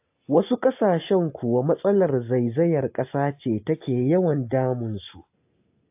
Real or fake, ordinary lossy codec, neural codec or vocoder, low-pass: real; AAC, 32 kbps; none; 3.6 kHz